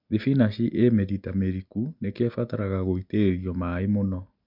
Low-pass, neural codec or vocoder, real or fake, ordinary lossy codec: 5.4 kHz; none; real; MP3, 48 kbps